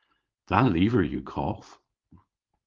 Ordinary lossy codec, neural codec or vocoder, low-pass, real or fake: Opus, 32 kbps; codec, 16 kHz, 4.8 kbps, FACodec; 7.2 kHz; fake